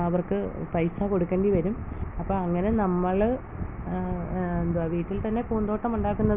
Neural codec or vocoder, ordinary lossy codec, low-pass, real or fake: none; none; 3.6 kHz; real